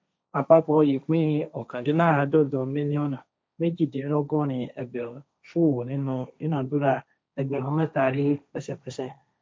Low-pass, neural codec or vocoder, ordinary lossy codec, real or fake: none; codec, 16 kHz, 1.1 kbps, Voila-Tokenizer; none; fake